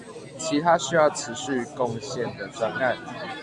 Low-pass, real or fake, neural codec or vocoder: 10.8 kHz; real; none